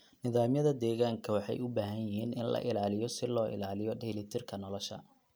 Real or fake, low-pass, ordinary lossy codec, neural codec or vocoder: real; none; none; none